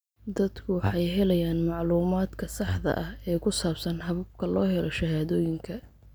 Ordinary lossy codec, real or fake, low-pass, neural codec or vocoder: none; real; none; none